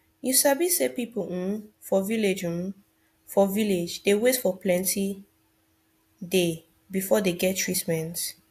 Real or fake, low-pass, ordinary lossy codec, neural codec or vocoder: real; 14.4 kHz; AAC, 64 kbps; none